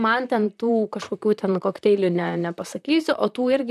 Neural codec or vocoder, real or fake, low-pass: vocoder, 44.1 kHz, 128 mel bands, Pupu-Vocoder; fake; 14.4 kHz